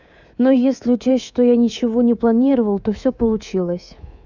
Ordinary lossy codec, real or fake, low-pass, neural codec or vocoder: none; fake; 7.2 kHz; codec, 24 kHz, 3.1 kbps, DualCodec